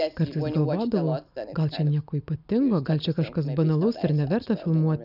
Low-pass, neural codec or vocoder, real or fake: 5.4 kHz; none; real